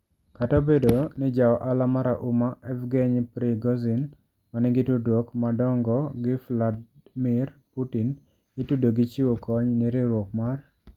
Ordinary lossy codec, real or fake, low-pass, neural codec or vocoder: Opus, 32 kbps; real; 19.8 kHz; none